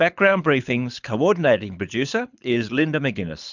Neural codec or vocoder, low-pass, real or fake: codec, 24 kHz, 6 kbps, HILCodec; 7.2 kHz; fake